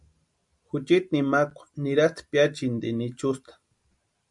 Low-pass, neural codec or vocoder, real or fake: 10.8 kHz; none; real